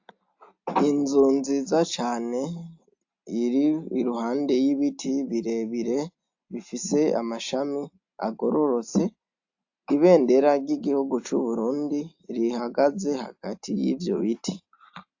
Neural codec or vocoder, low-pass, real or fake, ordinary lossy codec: none; 7.2 kHz; real; AAC, 48 kbps